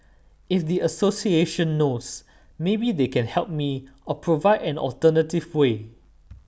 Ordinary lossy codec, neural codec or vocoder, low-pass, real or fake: none; none; none; real